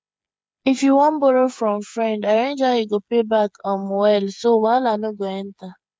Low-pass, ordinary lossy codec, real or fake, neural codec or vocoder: none; none; fake; codec, 16 kHz, 8 kbps, FreqCodec, smaller model